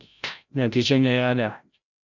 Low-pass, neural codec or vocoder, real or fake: 7.2 kHz; codec, 16 kHz, 0.5 kbps, FreqCodec, larger model; fake